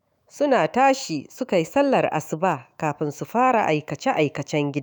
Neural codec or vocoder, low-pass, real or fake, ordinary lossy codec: autoencoder, 48 kHz, 128 numbers a frame, DAC-VAE, trained on Japanese speech; none; fake; none